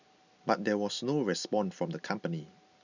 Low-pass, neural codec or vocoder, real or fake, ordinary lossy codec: 7.2 kHz; none; real; none